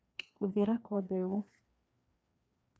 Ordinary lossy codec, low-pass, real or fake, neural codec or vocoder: none; none; fake; codec, 16 kHz, 2 kbps, FreqCodec, larger model